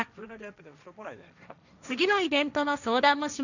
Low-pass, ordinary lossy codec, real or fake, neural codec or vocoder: 7.2 kHz; none; fake; codec, 16 kHz, 1.1 kbps, Voila-Tokenizer